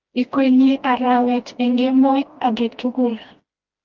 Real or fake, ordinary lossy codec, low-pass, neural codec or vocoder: fake; Opus, 32 kbps; 7.2 kHz; codec, 16 kHz, 1 kbps, FreqCodec, smaller model